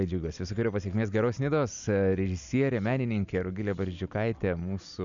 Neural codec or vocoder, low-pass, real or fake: none; 7.2 kHz; real